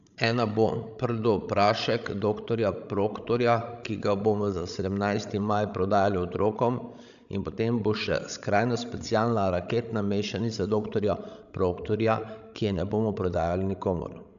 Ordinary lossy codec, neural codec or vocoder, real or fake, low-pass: none; codec, 16 kHz, 16 kbps, FreqCodec, larger model; fake; 7.2 kHz